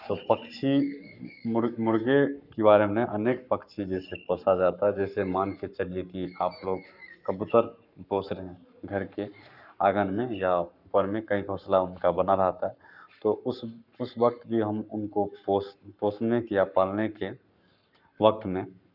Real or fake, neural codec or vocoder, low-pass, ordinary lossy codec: fake; codec, 44.1 kHz, 7.8 kbps, Pupu-Codec; 5.4 kHz; none